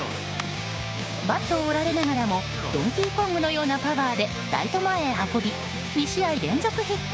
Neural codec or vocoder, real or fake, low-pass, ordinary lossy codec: codec, 16 kHz, 6 kbps, DAC; fake; none; none